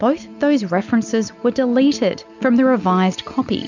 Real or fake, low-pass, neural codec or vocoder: real; 7.2 kHz; none